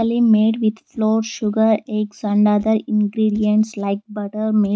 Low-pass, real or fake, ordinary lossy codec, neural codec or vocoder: none; real; none; none